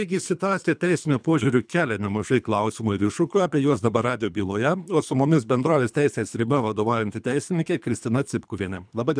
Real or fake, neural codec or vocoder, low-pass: fake; codec, 24 kHz, 3 kbps, HILCodec; 9.9 kHz